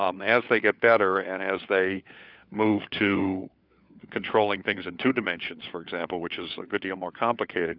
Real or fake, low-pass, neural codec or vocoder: fake; 5.4 kHz; codec, 16 kHz, 4 kbps, FunCodec, trained on LibriTTS, 50 frames a second